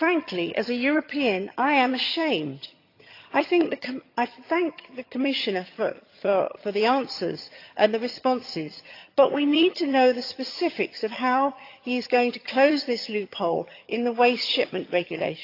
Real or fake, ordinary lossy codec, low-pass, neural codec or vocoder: fake; AAC, 32 kbps; 5.4 kHz; vocoder, 22.05 kHz, 80 mel bands, HiFi-GAN